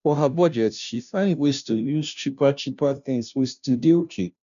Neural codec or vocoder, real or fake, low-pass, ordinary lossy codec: codec, 16 kHz, 0.5 kbps, FunCodec, trained on Chinese and English, 25 frames a second; fake; 7.2 kHz; none